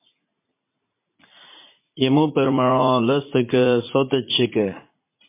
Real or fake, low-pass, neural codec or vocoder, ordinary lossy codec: fake; 3.6 kHz; vocoder, 44.1 kHz, 80 mel bands, Vocos; MP3, 24 kbps